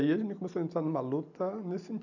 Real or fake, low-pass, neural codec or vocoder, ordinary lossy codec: real; 7.2 kHz; none; none